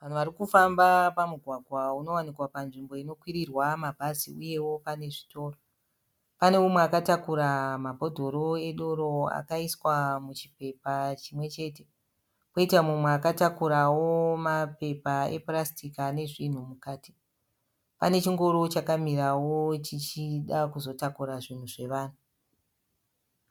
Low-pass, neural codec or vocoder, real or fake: 19.8 kHz; none; real